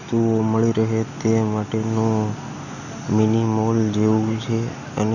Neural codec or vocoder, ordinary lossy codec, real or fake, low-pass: none; none; real; 7.2 kHz